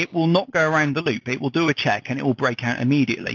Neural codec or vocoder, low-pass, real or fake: none; 7.2 kHz; real